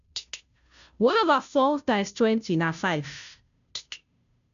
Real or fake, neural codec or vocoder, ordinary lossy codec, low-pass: fake; codec, 16 kHz, 0.5 kbps, FunCodec, trained on Chinese and English, 25 frames a second; none; 7.2 kHz